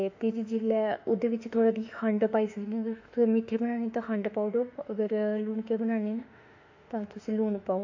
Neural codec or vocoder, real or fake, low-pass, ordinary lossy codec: autoencoder, 48 kHz, 32 numbers a frame, DAC-VAE, trained on Japanese speech; fake; 7.2 kHz; AAC, 48 kbps